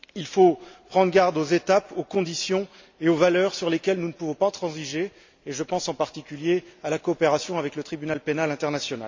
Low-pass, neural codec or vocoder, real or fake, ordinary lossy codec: 7.2 kHz; none; real; MP3, 64 kbps